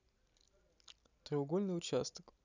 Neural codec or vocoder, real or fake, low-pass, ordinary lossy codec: none; real; 7.2 kHz; none